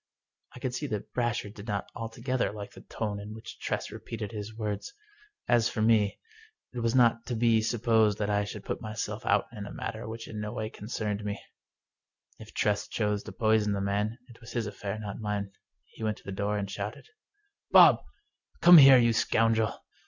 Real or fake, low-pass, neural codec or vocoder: real; 7.2 kHz; none